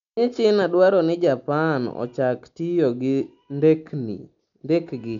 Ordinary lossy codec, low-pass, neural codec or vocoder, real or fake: MP3, 64 kbps; 7.2 kHz; none; real